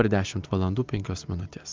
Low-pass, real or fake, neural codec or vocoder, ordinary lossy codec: 7.2 kHz; real; none; Opus, 24 kbps